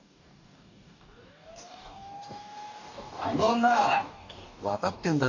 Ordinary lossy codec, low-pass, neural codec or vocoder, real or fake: none; 7.2 kHz; codec, 44.1 kHz, 2.6 kbps, DAC; fake